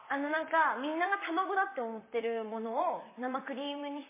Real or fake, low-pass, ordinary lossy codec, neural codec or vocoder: fake; 3.6 kHz; MP3, 16 kbps; vocoder, 44.1 kHz, 128 mel bands, Pupu-Vocoder